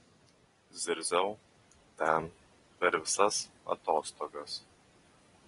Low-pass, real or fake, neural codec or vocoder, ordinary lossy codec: 10.8 kHz; real; none; AAC, 32 kbps